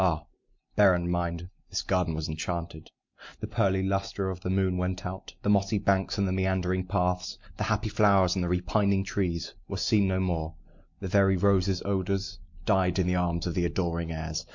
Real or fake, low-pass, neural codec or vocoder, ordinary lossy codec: real; 7.2 kHz; none; MP3, 48 kbps